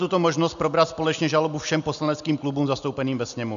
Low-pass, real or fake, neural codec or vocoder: 7.2 kHz; real; none